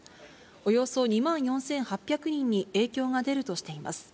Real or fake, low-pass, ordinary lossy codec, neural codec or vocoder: real; none; none; none